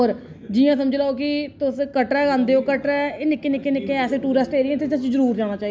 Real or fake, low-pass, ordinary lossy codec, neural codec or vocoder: real; none; none; none